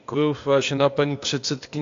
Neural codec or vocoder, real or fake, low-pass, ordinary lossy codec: codec, 16 kHz, 0.8 kbps, ZipCodec; fake; 7.2 kHz; AAC, 48 kbps